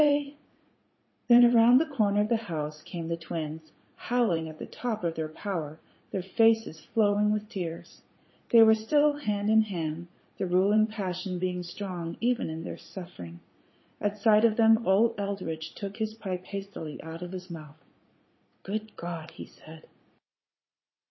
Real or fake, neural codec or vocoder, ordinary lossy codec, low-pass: fake; vocoder, 44.1 kHz, 80 mel bands, Vocos; MP3, 24 kbps; 7.2 kHz